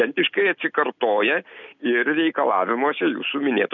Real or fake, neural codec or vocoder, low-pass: real; none; 7.2 kHz